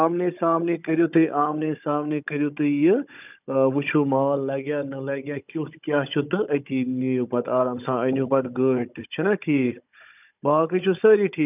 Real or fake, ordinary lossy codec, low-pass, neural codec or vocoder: fake; none; 3.6 kHz; codec, 16 kHz, 16 kbps, FunCodec, trained on Chinese and English, 50 frames a second